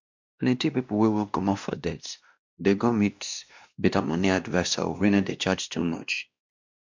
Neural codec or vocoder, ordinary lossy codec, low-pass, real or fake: codec, 16 kHz, 1 kbps, X-Codec, WavLM features, trained on Multilingual LibriSpeech; MP3, 64 kbps; 7.2 kHz; fake